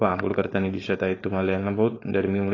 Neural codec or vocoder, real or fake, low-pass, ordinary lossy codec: codec, 16 kHz, 4.8 kbps, FACodec; fake; 7.2 kHz; AAC, 32 kbps